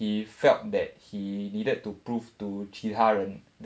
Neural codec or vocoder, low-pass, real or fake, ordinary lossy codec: none; none; real; none